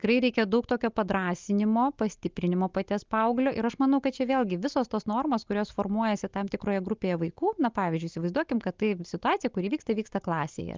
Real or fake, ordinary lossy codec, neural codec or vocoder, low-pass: real; Opus, 32 kbps; none; 7.2 kHz